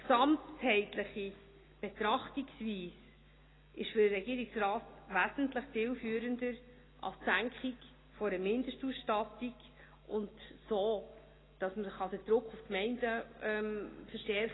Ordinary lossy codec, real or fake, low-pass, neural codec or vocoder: AAC, 16 kbps; real; 7.2 kHz; none